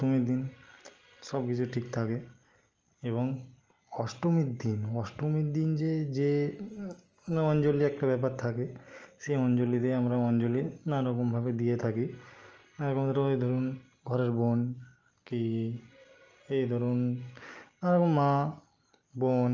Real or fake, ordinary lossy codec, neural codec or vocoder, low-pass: real; Opus, 24 kbps; none; 7.2 kHz